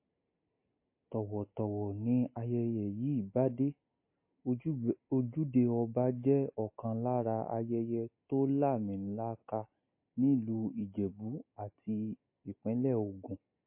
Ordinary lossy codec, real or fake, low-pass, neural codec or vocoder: MP3, 24 kbps; real; 3.6 kHz; none